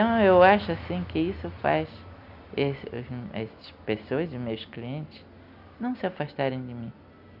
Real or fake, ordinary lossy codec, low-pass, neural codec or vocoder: real; none; 5.4 kHz; none